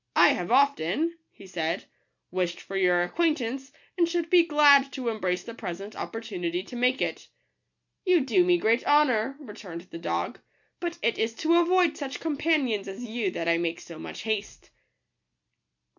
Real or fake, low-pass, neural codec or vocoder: real; 7.2 kHz; none